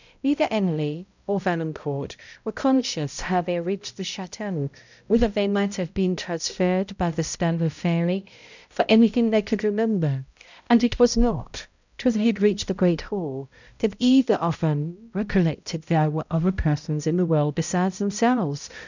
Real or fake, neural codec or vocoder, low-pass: fake; codec, 16 kHz, 0.5 kbps, X-Codec, HuBERT features, trained on balanced general audio; 7.2 kHz